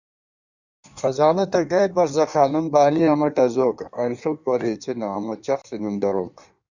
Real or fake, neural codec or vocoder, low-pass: fake; codec, 16 kHz in and 24 kHz out, 1.1 kbps, FireRedTTS-2 codec; 7.2 kHz